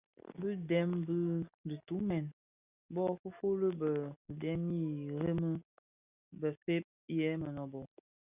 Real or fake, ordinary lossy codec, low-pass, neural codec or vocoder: real; Opus, 64 kbps; 3.6 kHz; none